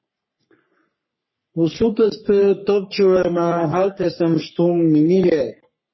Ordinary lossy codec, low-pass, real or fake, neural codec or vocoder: MP3, 24 kbps; 7.2 kHz; fake; codec, 44.1 kHz, 3.4 kbps, Pupu-Codec